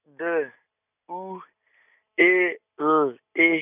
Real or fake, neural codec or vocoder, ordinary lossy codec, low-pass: real; none; none; 3.6 kHz